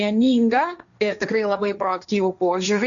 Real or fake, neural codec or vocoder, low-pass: fake; codec, 16 kHz, 1.1 kbps, Voila-Tokenizer; 7.2 kHz